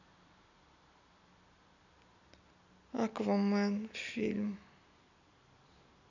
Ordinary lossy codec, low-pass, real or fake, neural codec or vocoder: none; 7.2 kHz; real; none